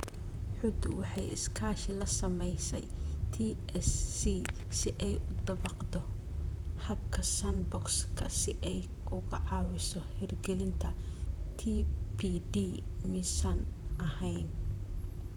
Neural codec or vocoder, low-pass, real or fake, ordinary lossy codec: vocoder, 44.1 kHz, 128 mel bands, Pupu-Vocoder; 19.8 kHz; fake; none